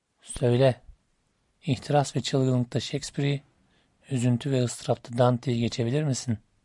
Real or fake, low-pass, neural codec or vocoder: real; 10.8 kHz; none